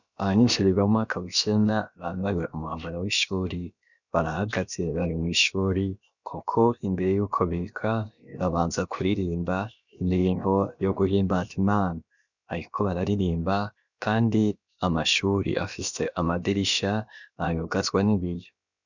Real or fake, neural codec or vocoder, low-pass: fake; codec, 16 kHz, about 1 kbps, DyCAST, with the encoder's durations; 7.2 kHz